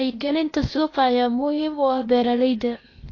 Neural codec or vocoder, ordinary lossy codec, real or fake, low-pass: codec, 16 kHz, 0.8 kbps, ZipCodec; AAC, 32 kbps; fake; 7.2 kHz